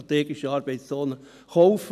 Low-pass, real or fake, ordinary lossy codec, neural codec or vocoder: 14.4 kHz; real; none; none